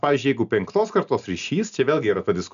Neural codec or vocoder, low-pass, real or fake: none; 7.2 kHz; real